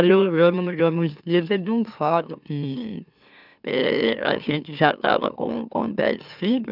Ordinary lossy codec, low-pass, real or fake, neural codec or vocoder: none; 5.4 kHz; fake; autoencoder, 44.1 kHz, a latent of 192 numbers a frame, MeloTTS